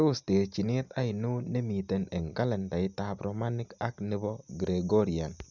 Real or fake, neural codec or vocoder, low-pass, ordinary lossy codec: real; none; 7.2 kHz; none